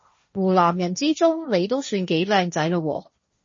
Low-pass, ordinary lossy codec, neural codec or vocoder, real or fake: 7.2 kHz; MP3, 32 kbps; codec, 16 kHz, 1.1 kbps, Voila-Tokenizer; fake